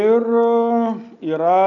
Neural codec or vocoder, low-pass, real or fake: none; 7.2 kHz; real